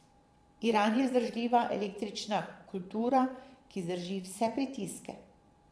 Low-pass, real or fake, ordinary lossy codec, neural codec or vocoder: none; fake; none; vocoder, 22.05 kHz, 80 mel bands, Vocos